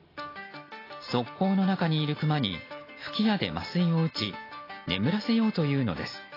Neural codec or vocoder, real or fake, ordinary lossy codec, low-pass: none; real; AAC, 24 kbps; 5.4 kHz